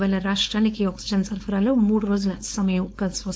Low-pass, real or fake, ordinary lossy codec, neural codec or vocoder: none; fake; none; codec, 16 kHz, 4.8 kbps, FACodec